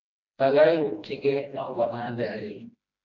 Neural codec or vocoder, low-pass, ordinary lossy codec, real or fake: codec, 16 kHz, 1 kbps, FreqCodec, smaller model; 7.2 kHz; MP3, 48 kbps; fake